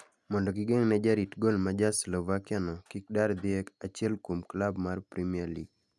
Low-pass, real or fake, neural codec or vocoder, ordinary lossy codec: none; real; none; none